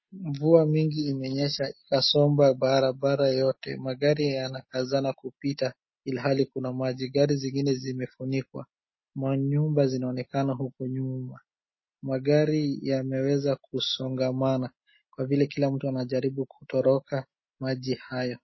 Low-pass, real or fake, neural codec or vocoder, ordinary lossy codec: 7.2 kHz; real; none; MP3, 24 kbps